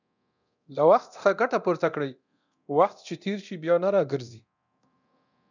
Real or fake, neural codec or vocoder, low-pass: fake; codec, 24 kHz, 0.9 kbps, DualCodec; 7.2 kHz